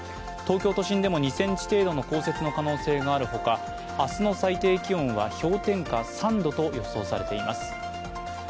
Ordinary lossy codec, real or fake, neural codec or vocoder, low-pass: none; real; none; none